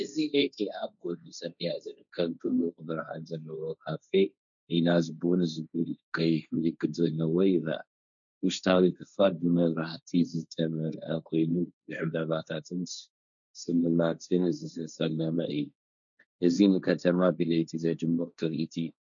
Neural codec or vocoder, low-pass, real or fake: codec, 16 kHz, 1.1 kbps, Voila-Tokenizer; 7.2 kHz; fake